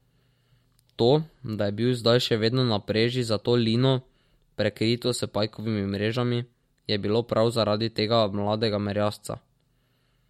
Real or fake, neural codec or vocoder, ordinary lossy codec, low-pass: real; none; MP3, 64 kbps; 19.8 kHz